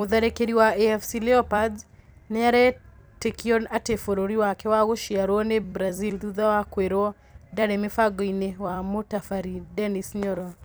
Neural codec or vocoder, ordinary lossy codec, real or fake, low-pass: vocoder, 44.1 kHz, 128 mel bands every 256 samples, BigVGAN v2; none; fake; none